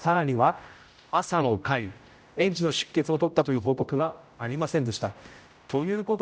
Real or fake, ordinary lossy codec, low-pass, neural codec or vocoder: fake; none; none; codec, 16 kHz, 0.5 kbps, X-Codec, HuBERT features, trained on general audio